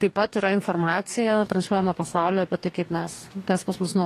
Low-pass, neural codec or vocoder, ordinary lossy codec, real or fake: 14.4 kHz; codec, 44.1 kHz, 2.6 kbps, DAC; AAC, 48 kbps; fake